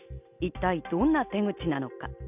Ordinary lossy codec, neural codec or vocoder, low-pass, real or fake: none; none; 3.6 kHz; real